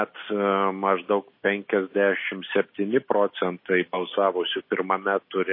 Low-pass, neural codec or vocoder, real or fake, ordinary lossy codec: 5.4 kHz; none; real; MP3, 24 kbps